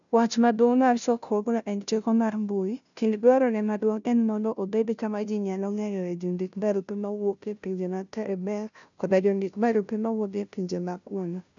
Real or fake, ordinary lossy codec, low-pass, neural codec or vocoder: fake; none; 7.2 kHz; codec, 16 kHz, 0.5 kbps, FunCodec, trained on Chinese and English, 25 frames a second